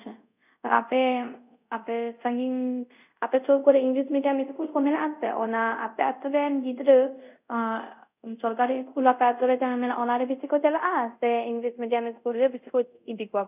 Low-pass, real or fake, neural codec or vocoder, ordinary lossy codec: 3.6 kHz; fake; codec, 24 kHz, 0.5 kbps, DualCodec; none